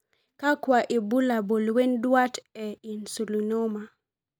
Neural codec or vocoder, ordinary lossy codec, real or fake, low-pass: none; none; real; none